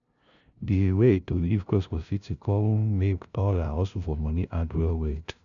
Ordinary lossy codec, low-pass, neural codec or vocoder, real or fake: none; 7.2 kHz; codec, 16 kHz, 0.5 kbps, FunCodec, trained on LibriTTS, 25 frames a second; fake